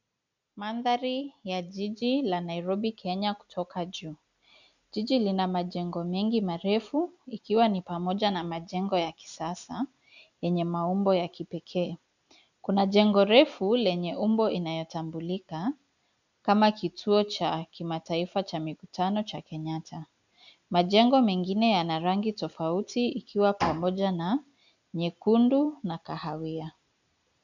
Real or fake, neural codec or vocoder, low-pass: real; none; 7.2 kHz